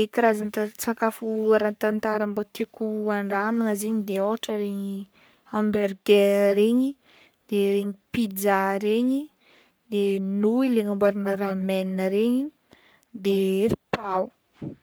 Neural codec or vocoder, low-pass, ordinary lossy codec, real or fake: codec, 44.1 kHz, 3.4 kbps, Pupu-Codec; none; none; fake